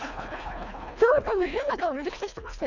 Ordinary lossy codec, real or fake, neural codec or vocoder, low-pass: none; fake; codec, 24 kHz, 1.5 kbps, HILCodec; 7.2 kHz